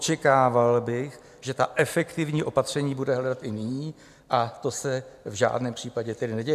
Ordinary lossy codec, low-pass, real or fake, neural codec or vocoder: AAC, 96 kbps; 14.4 kHz; real; none